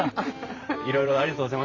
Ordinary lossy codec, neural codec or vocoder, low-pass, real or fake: AAC, 32 kbps; vocoder, 44.1 kHz, 128 mel bands every 512 samples, BigVGAN v2; 7.2 kHz; fake